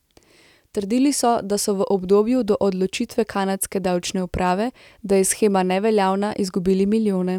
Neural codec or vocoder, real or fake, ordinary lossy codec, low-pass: none; real; none; 19.8 kHz